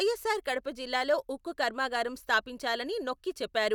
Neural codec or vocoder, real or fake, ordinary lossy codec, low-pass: none; real; none; none